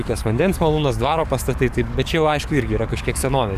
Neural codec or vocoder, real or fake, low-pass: codec, 44.1 kHz, 7.8 kbps, DAC; fake; 14.4 kHz